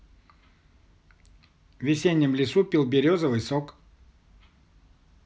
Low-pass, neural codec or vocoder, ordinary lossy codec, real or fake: none; none; none; real